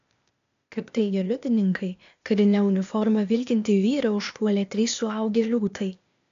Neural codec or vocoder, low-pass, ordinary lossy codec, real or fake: codec, 16 kHz, 0.8 kbps, ZipCodec; 7.2 kHz; AAC, 64 kbps; fake